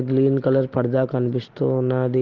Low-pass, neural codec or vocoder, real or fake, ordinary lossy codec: 7.2 kHz; none; real; Opus, 16 kbps